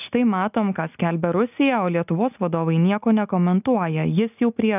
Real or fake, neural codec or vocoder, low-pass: real; none; 3.6 kHz